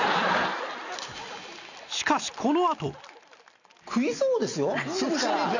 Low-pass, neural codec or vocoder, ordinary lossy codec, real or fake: 7.2 kHz; none; none; real